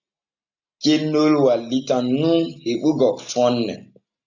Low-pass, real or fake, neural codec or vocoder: 7.2 kHz; real; none